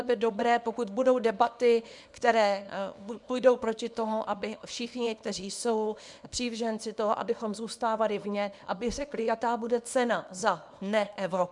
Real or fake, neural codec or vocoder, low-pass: fake; codec, 24 kHz, 0.9 kbps, WavTokenizer, small release; 10.8 kHz